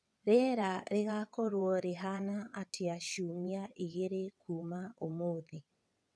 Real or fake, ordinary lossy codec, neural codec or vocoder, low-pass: fake; none; vocoder, 22.05 kHz, 80 mel bands, WaveNeXt; none